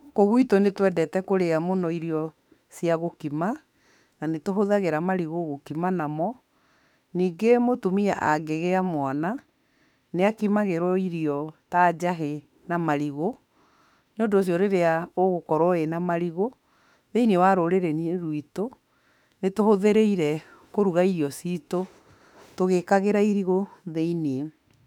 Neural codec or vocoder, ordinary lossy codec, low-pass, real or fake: autoencoder, 48 kHz, 32 numbers a frame, DAC-VAE, trained on Japanese speech; none; 19.8 kHz; fake